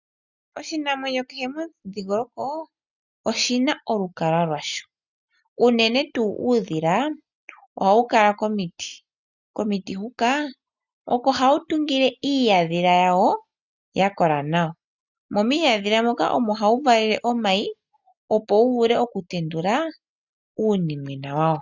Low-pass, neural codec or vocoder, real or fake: 7.2 kHz; none; real